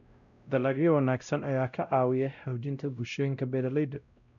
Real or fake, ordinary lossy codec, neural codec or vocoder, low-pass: fake; none; codec, 16 kHz, 0.5 kbps, X-Codec, WavLM features, trained on Multilingual LibriSpeech; 7.2 kHz